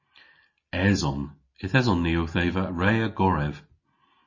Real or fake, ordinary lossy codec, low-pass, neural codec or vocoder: real; MP3, 32 kbps; 7.2 kHz; none